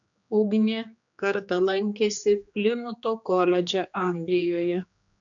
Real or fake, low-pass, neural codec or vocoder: fake; 7.2 kHz; codec, 16 kHz, 2 kbps, X-Codec, HuBERT features, trained on general audio